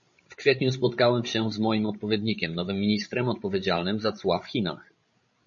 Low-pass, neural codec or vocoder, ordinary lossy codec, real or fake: 7.2 kHz; codec, 16 kHz, 16 kbps, FreqCodec, larger model; MP3, 32 kbps; fake